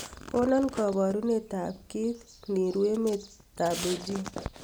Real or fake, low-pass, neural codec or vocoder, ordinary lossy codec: real; none; none; none